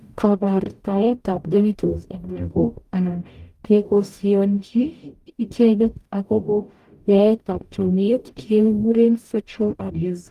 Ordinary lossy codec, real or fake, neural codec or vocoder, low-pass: Opus, 32 kbps; fake; codec, 44.1 kHz, 0.9 kbps, DAC; 14.4 kHz